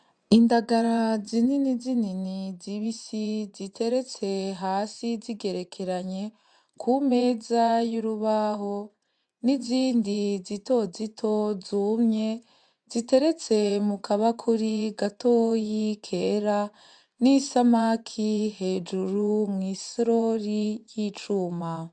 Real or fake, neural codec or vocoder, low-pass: fake; vocoder, 24 kHz, 100 mel bands, Vocos; 9.9 kHz